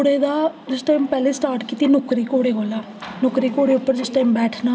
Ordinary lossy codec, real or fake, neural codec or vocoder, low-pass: none; real; none; none